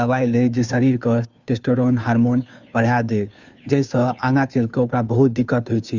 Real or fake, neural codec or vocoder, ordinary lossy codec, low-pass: fake; codec, 16 kHz, 2 kbps, FunCodec, trained on Chinese and English, 25 frames a second; Opus, 64 kbps; 7.2 kHz